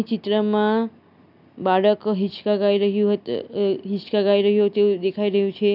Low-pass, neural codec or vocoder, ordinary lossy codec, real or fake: 5.4 kHz; none; none; real